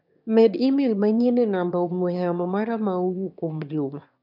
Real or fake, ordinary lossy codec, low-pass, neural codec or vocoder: fake; none; 5.4 kHz; autoencoder, 22.05 kHz, a latent of 192 numbers a frame, VITS, trained on one speaker